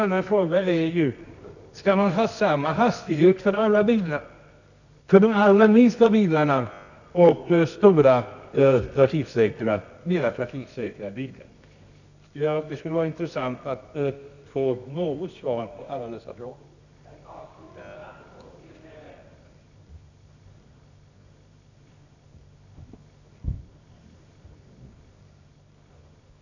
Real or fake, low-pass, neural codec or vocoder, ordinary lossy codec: fake; 7.2 kHz; codec, 24 kHz, 0.9 kbps, WavTokenizer, medium music audio release; none